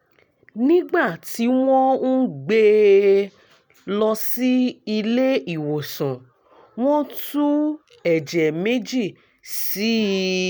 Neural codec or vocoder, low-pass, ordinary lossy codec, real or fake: none; none; none; real